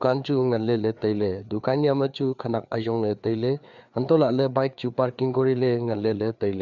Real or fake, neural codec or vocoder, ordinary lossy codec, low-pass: fake; codec, 16 kHz, 4 kbps, FreqCodec, larger model; Opus, 64 kbps; 7.2 kHz